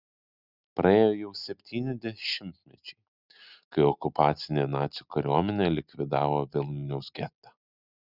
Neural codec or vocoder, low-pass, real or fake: none; 5.4 kHz; real